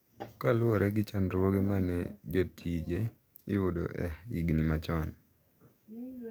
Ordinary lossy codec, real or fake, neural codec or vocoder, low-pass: none; fake; codec, 44.1 kHz, 7.8 kbps, DAC; none